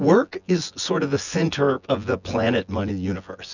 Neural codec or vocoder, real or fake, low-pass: vocoder, 24 kHz, 100 mel bands, Vocos; fake; 7.2 kHz